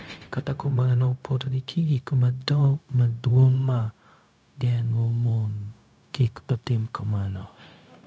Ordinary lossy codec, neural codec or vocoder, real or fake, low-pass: none; codec, 16 kHz, 0.4 kbps, LongCat-Audio-Codec; fake; none